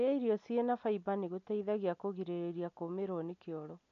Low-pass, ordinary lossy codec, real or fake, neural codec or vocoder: 7.2 kHz; none; real; none